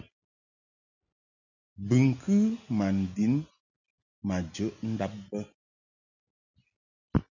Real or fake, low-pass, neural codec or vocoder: real; 7.2 kHz; none